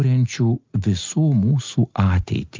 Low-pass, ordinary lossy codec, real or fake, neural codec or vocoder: 7.2 kHz; Opus, 32 kbps; real; none